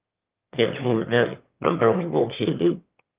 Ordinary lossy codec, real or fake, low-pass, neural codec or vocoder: Opus, 24 kbps; fake; 3.6 kHz; autoencoder, 22.05 kHz, a latent of 192 numbers a frame, VITS, trained on one speaker